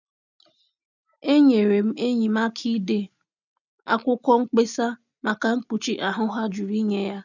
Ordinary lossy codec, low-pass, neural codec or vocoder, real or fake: none; 7.2 kHz; none; real